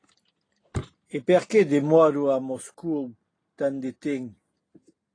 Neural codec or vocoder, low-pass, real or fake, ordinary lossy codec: none; 9.9 kHz; real; AAC, 32 kbps